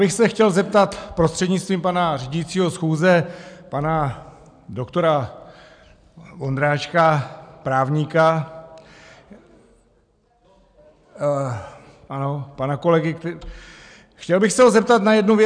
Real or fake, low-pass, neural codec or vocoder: real; 9.9 kHz; none